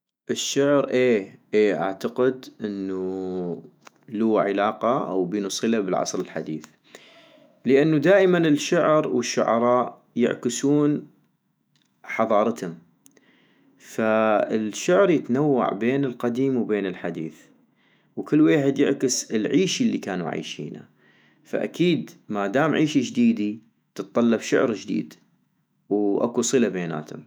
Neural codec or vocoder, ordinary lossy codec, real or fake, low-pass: autoencoder, 48 kHz, 128 numbers a frame, DAC-VAE, trained on Japanese speech; none; fake; none